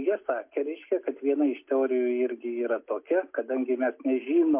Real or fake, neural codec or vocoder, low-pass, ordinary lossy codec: real; none; 3.6 kHz; MP3, 32 kbps